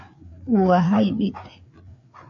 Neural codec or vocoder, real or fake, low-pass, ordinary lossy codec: codec, 16 kHz, 4 kbps, FreqCodec, larger model; fake; 7.2 kHz; AAC, 48 kbps